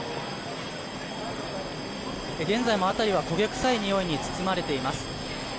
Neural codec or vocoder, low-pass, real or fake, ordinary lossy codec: none; none; real; none